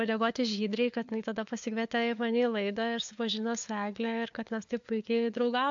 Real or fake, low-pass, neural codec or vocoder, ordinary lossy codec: fake; 7.2 kHz; codec, 16 kHz, 4 kbps, FunCodec, trained on LibriTTS, 50 frames a second; AAC, 64 kbps